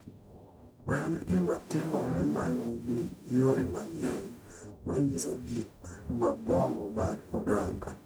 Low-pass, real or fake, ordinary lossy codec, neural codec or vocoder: none; fake; none; codec, 44.1 kHz, 0.9 kbps, DAC